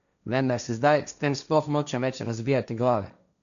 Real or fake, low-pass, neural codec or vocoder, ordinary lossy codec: fake; 7.2 kHz; codec, 16 kHz, 1.1 kbps, Voila-Tokenizer; none